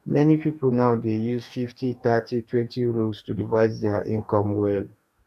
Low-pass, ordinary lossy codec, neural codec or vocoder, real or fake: 14.4 kHz; none; codec, 44.1 kHz, 2.6 kbps, DAC; fake